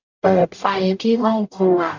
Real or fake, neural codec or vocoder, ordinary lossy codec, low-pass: fake; codec, 44.1 kHz, 0.9 kbps, DAC; AAC, 48 kbps; 7.2 kHz